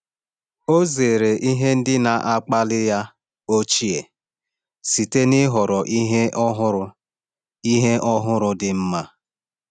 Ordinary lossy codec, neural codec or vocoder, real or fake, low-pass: none; none; real; 9.9 kHz